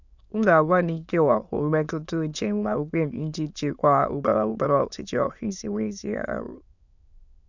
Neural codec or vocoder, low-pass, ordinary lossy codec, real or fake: autoencoder, 22.05 kHz, a latent of 192 numbers a frame, VITS, trained on many speakers; 7.2 kHz; none; fake